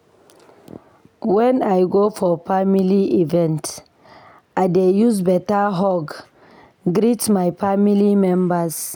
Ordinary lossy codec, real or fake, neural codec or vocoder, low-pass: none; real; none; none